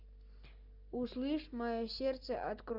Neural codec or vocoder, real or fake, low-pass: none; real; 5.4 kHz